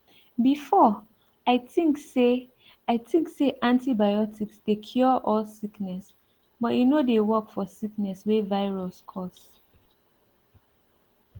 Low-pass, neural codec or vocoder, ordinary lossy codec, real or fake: 19.8 kHz; none; Opus, 16 kbps; real